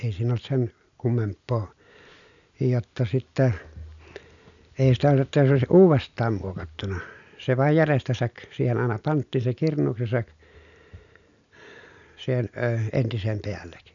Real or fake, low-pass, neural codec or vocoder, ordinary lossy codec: real; 7.2 kHz; none; none